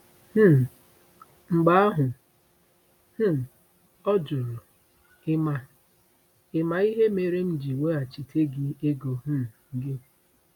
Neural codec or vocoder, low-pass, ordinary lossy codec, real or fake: none; 19.8 kHz; none; real